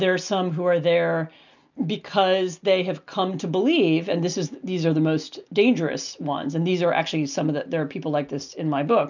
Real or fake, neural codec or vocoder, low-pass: real; none; 7.2 kHz